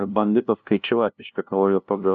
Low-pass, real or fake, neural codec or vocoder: 7.2 kHz; fake; codec, 16 kHz, 0.5 kbps, FunCodec, trained on LibriTTS, 25 frames a second